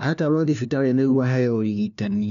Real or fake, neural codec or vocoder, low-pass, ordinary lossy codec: fake; codec, 16 kHz, 1 kbps, FunCodec, trained on LibriTTS, 50 frames a second; 7.2 kHz; none